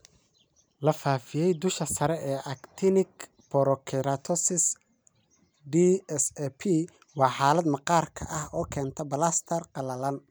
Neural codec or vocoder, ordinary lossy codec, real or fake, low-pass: none; none; real; none